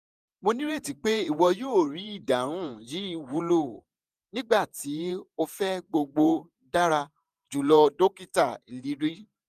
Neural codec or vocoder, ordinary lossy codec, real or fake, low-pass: vocoder, 48 kHz, 128 mel bands, Vocos; none; fake; 14.4 kHz